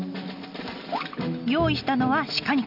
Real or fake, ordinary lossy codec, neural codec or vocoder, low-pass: real; none; none; 5.4 kHz